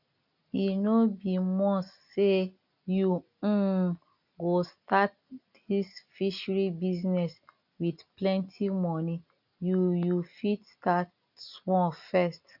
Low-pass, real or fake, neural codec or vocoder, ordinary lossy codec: 5.4 kHz; real; none; none